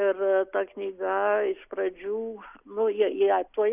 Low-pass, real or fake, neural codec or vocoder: 3.6 kHz; real; none